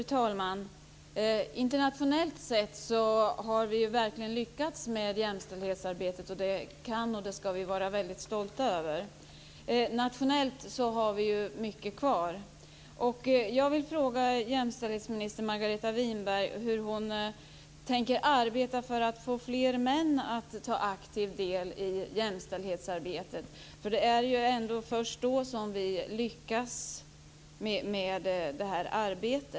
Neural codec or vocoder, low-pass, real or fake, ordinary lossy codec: none; none; real; none